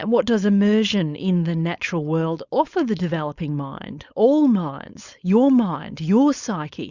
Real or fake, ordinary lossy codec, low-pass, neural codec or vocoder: fake; Opus, 64 kbps; 7.2 kHz; codec, 16 kHz, 4.8 kbps, FACodec